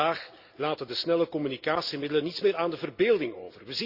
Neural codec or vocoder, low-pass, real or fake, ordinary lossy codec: none; 5.4 kHz; real; Opus, 64 kbps